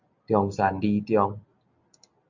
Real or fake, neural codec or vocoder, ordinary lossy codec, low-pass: real; none; MP3, 64 kbps; 7.2 kHz